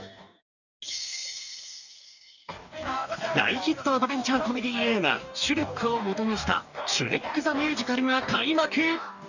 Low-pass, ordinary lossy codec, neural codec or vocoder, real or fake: 7.2 kHz; none; codec, 44.1 kHz, 2.6 kbps, DAC; fake